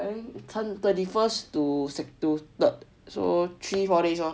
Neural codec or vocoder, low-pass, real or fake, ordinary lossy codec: none; none; real; none